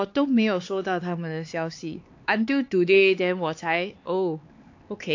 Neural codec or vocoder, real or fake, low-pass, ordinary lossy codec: codec, 16 kHz, 4 kbps, X-Codec, HuBERT features, trained on LibriSpeech; fake; 7.2 kHz; AAC, 48 kbps